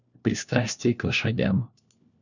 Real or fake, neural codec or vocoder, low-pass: fake; codec, 16 kHz, 1 kbps, FunCodec, trained on LibriTTS, 50 frames a second; 7.2 kHz